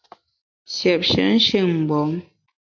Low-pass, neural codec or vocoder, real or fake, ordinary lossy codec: 7.2 kHz; none; real; AAC, 48 kbps